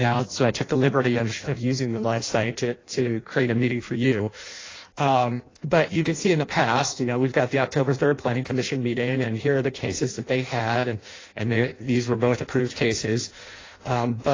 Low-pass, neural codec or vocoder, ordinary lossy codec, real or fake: 7.2 kHz; codec, 16 kHz in and 24 kHz out, 0.6 kbps, FireRedTTS-2 codec; AAC, 32 kbps; fake